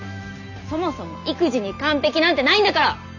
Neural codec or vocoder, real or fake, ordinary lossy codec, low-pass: none; real; none; 7.2 kHz